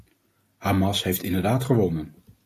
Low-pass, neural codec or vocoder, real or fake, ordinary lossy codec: 14.4 kHz; none; real; AAC, 48 kbps